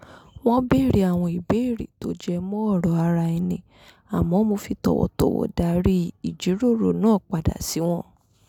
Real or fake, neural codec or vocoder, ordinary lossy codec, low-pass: real; none; none; 19.8 kHz